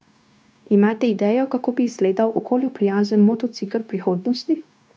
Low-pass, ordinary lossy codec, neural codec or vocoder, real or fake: none; none; codec, 16 kHz, 0.9 kbps, LongCat-Audio-Codec; fake